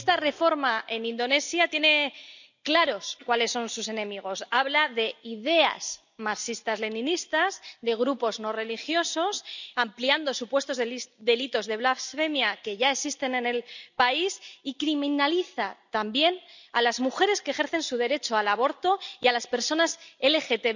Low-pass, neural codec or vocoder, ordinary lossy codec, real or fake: 7.2 kHz; none; none; real